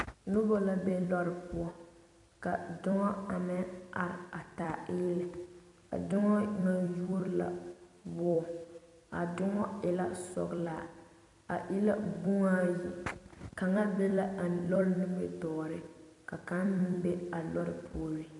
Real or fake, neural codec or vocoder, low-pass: fake; vocoder, 44.1 kHz, 128 mel bands every 256 samples, BigVGAN v2; 10.8 kHz